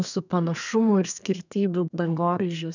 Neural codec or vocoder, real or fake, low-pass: codec, 32 kHz, 1.9 kbps, SNAC; fake; 7.2 kHz